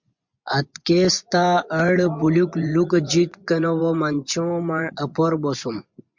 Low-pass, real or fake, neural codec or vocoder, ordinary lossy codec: 7.2 kHz; fake; vocoder, 24 kHz, 100 mel bands, Vocos; MP3, 64 kbps